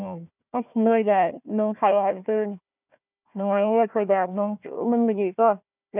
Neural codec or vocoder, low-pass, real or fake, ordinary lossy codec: codec, 16 kHz, 1 kbps, FunCodec, trained on Chinese and English, 50 frames a second; 3.6 kHz; fake; none